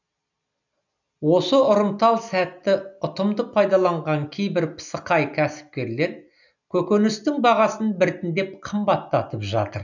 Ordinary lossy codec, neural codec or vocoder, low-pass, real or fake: none; none; 7.2 kHz; real